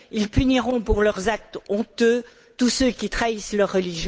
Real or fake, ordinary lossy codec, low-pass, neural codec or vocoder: fake; none; none; codec, 16 kHz, 8 kbps, FunCodec, trained on Chinese and English, 25 frames a second